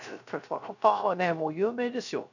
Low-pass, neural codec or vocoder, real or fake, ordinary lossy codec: 7.2 kHz; codec, 16 kHz, 0.3 kbps, FocalCodec; fake; none